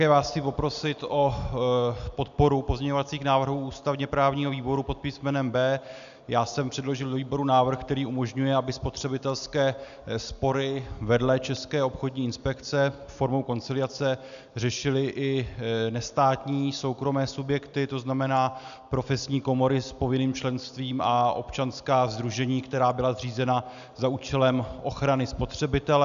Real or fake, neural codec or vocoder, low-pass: real; none; 7.2 kHz